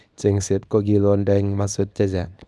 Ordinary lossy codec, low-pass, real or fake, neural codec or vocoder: none; none; fake; codec, 24 kHz, 0.9 kbps, WavTokenizer, small release